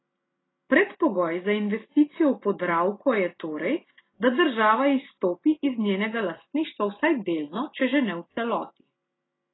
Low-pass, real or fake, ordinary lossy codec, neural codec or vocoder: 7.2 kHz; real; AAC, 16 kbps; none